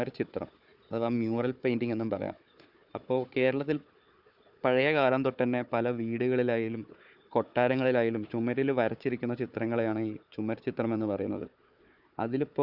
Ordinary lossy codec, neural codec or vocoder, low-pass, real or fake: Opus, 64 kbps; codec, 16 kHz, 4 kbps, FunCodec, trained on Chinese and English, 50 frames a second; 5.4 kHz; fake